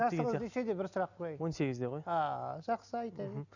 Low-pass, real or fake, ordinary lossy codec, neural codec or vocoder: 7.2 kHz; real; none; none